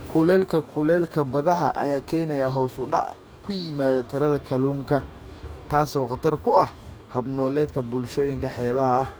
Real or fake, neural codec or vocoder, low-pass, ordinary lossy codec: fake; codec, 44.1 kHz, 2.6 kbps, DAC; none; none